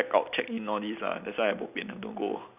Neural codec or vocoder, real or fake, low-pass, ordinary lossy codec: none; real; 3.6 kHz; none